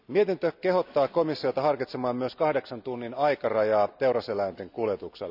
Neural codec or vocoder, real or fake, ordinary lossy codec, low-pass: none; real; none; 5.4 kHz